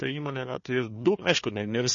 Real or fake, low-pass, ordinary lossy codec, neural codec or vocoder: fake; 7.2 kHz; MP3, 32 kbps; codec, 16 kHz, 1 kbps, FunCodec, trained on Chinese and English, 50 frames a second